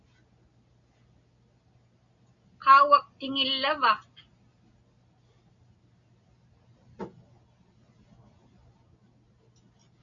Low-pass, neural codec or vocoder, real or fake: 7.2 kHz; none; real